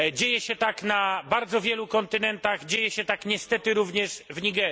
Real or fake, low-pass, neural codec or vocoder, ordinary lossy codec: real; none; none; none